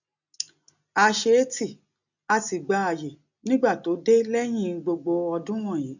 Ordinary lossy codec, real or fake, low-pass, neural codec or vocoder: none; real; 7.2 kHz; none